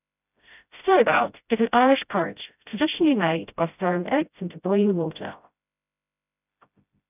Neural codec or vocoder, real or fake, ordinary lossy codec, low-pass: codec, 16 kHz, 0.5 kbps, FreqCodec, smaller model; fake; none; 3.6 kHz